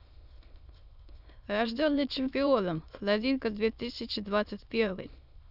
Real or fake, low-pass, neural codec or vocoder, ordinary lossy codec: fake; 5.4 kHz; autoencoder, 22.05 kHz, a latent of 192 numbers a frame, VITS, trained on many speakers; none